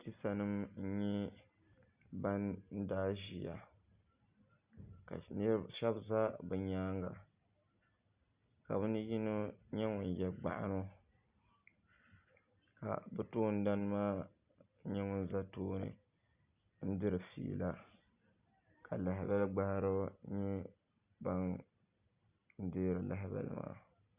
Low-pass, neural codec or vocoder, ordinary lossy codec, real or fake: 3.6 kHz; none; Opus, 64 kbps; real